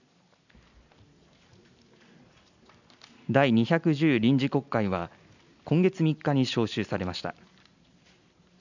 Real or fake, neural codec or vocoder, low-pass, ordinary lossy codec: real; none; 7.2 kHz; none